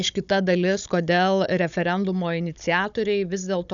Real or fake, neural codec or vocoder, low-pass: fake; codec, 16 kHz, 16 kbps, FunCodec, trained on Chinese and English, 50 frames a second; 7.2 kHz